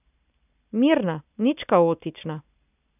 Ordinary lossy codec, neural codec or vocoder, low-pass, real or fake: none; none; 3.6 kHz; real